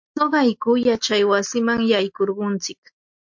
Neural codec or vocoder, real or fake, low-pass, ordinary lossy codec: none; real; 7.2 kHz; MP3, 48 kbps